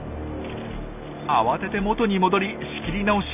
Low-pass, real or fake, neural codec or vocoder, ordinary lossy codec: 3.6 kHz; real; none; none